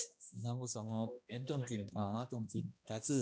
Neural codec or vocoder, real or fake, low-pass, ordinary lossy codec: codec, 16 kHz, 1 kbps, X-Codec, HuBERT features, trained on balanced general audio; fake; none; none